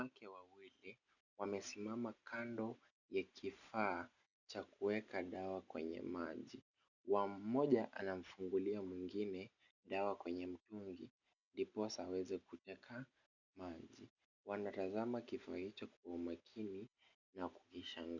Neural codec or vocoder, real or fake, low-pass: none; real; 7.2 kHz